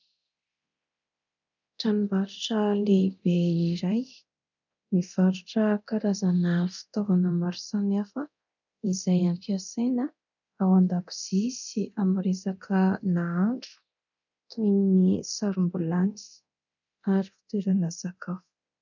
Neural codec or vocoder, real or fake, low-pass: codec, 24 kHz, 0.9 kbps, DualCodec; fake; 7.2 kHz